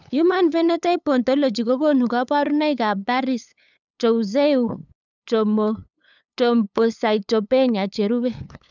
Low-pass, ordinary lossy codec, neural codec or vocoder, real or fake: 7.2 kHz; none; codec, 16 kHz, 8 kbps, FunCodec, trained on LibriTTS, 25 frames a second; fake